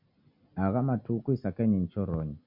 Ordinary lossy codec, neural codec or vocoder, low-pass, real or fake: MP3, 48 kbps; none; 5.4 kHz; real